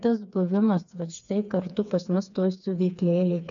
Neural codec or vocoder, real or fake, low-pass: codec, 16 kHz, 4 kbps, FreqCodec, smaller model; fake; 7.2 kHz